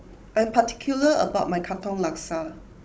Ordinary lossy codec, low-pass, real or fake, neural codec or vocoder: none; none; fake; codec, 16 kHz, 16 kbps, FunCodec, trained on Chinese and English, 50 frames a second